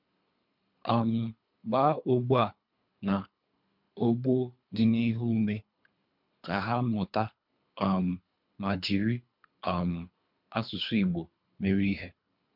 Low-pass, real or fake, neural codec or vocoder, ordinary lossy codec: 5.4 kHz; fake; codec, 24 kHz, 3 kbps, HILCodec; MP3, 48 kbps